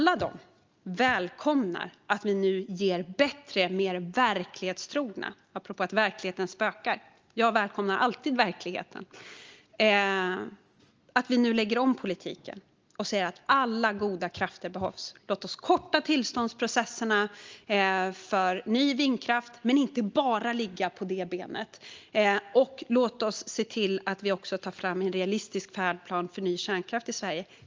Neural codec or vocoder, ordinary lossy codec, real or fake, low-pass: none; Opus, 32 kbps; real; 7.2 kHz